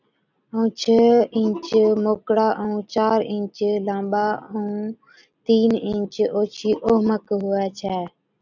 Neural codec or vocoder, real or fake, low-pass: none; real; 7.2 kHz